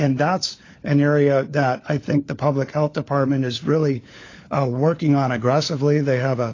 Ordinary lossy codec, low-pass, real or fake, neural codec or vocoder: AAC, 32 kbps; 7.2 kHz; fake; codec, 16 kHz, 4 kbps, FunCodec, trained on LibriTTS, 50 frames a second